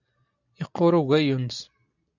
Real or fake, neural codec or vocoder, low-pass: real; none; 7.2 kHz